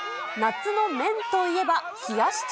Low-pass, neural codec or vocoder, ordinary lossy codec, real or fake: none; none; none; real